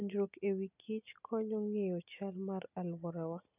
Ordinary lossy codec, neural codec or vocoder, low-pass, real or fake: none; none; 3.6 kHz; real